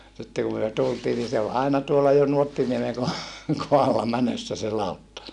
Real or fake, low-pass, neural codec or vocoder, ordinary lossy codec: real; 10.8 kHz; none; none